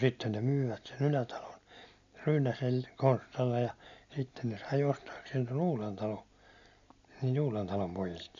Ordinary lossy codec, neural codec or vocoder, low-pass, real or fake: none; none; 7.2 kHz; real